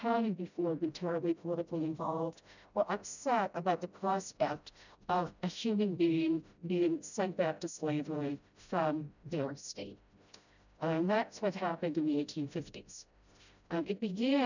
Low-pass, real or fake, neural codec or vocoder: 7.2 kHz; fake; codec, 16 kHz, 0.5 kbps, FreqCodec, smaller model